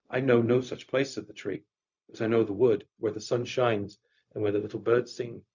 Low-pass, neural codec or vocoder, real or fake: 7.2 kHz; codec, 16 kHz, 0.4 kbps, LongCat-Audio-Codec; fake